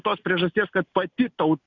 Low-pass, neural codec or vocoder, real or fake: 7.2 kHz; none; real